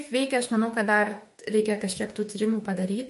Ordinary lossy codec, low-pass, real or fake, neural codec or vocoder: MP3, 48 kbps; 14.4 kHz; fake; autoencoder, 48 kHz, 32 numbers a frame, DAC-VAE, trained on Japanese speech